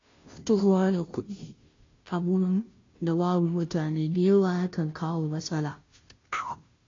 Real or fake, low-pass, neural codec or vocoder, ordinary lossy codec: fake; 7.2 kHz; codec, 16 kHz, 0.5 kbps, FunCodec, trained on Chinese and English, 25 frames a second; AAC, 32 kbps